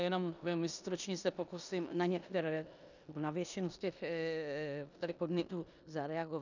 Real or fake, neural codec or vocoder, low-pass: fake; codec, 16 kHz in and 24 kHz out, 0.9 kbps, LongCat-Audio-Codec, four codebook decoder; 7.2 kHz